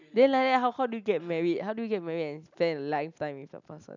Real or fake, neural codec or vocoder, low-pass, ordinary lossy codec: real; none; 7.2 kHz; none